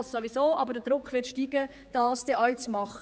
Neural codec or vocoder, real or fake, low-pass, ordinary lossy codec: codec, 16 kHz, 4 kbps, X-Codec, HuBERT features, trained on general audio; fake; none; none